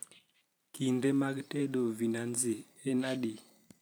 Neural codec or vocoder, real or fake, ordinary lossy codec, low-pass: vocoder, 44.1 kHz, 128 mel bands every 512 samples, BigVGAN v2; fake; none; none